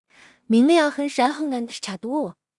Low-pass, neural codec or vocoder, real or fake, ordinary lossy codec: 10.8 kHz; codec, 16 kHz in and 24 kHz out, 0.4 kbps, LongCat-Audio-Codec, two codebook decoder; fake; Opus, 64 kbps